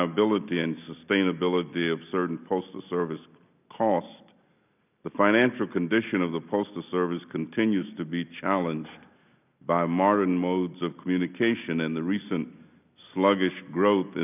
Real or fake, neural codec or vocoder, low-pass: real; none; 3.6 kHz